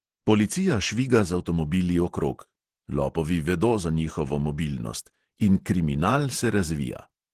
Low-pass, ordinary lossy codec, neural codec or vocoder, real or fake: 14.4 kHz; Opus, 16 kbps; vocoder, 48 kHz, 128 mel bands, Vocos; fake